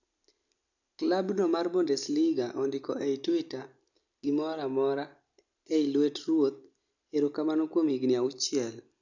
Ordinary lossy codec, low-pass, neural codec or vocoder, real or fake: none; 7.2 kHz; none; real